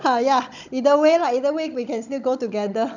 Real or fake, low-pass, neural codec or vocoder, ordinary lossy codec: fake; 7.2 kHz; codec, 16 kHz, 16 kbps, FunCodec, trained on Chinese and English, 50 frames a second; none